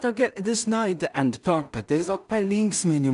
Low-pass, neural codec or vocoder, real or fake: 10.8 kHz; codec, 16 kHz in and 24 kHz out, 0.4 kbps, LongCat-Audio-Codec, two codebook decoder; fake